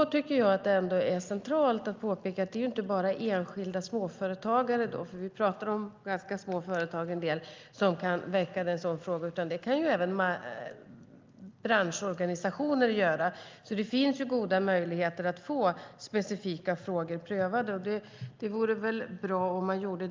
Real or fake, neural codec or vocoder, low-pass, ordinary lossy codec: real; none; 7.2 kHz; Opus, 24 kbps